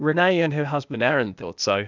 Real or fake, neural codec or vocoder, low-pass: fake; codec, 16 kHz, 0.8 kbps, ZipCodec; 7.2 kHz